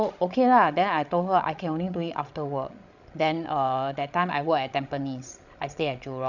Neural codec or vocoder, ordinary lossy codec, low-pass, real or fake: codec, 16 kHz, 16 kbps, FreqCodec, larger model; none; 7.2 kHz; fake